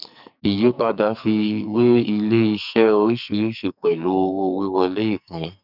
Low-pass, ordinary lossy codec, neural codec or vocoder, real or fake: 5.4 kHz; none; codec, 44.1 kHz, 2.6 kbps, SNAC; fake